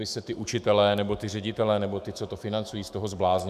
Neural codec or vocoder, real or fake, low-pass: autoencoder, 48 kHz, 128 numbers a frame, DAC-VAE, trained on Japanese speech; fake; 14.4 kHz